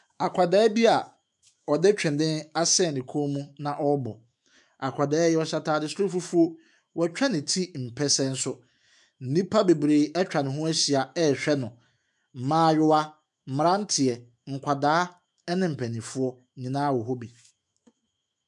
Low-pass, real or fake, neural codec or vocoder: 10.8 kHz; fake; autoencoder, 48 kHz, 128 numbers a frame, DAC-VAE, trained on Japanese speech